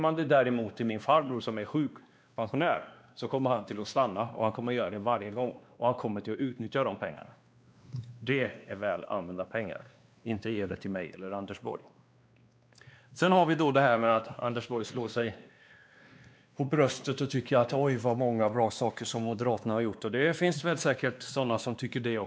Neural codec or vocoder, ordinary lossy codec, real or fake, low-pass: codec, 16 kHz, 2 kbps, X-Codec, WavLM features, trained on Multilingual LibriSpeech; none; fake; none